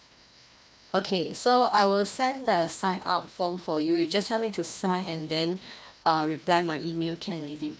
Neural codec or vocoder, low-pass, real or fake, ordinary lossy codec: codec, 16 kHz, 1 kbps, FreqCodec, larger model; none; fake; none